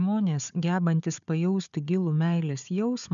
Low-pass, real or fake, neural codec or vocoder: 7.2 kHz; fake; codec, 16 kHz, 4 kbps, FunCodec, trained on Chinese and English, 50 frames a second